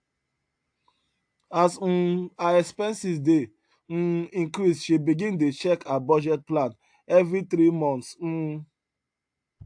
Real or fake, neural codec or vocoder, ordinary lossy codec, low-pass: real; none; AAC, 64 kbps; 9.9 kHz